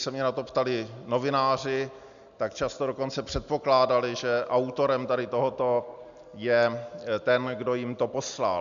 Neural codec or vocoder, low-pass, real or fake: none; 7.2 kHz; real